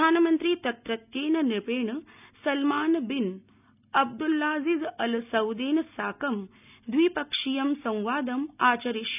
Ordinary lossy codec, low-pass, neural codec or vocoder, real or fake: none; 3.6 kHz; none; real